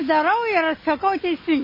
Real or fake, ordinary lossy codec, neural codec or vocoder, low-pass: real; MP3, 24 kbps; none; 5.4 kHz